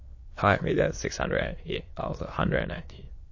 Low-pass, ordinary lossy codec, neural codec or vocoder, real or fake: 7.2 kHz; MP3, 32 kbps; autoencoder, 22.05 kHz, a latent of 192 numbers a frame, VITS, trained on many speakers; fake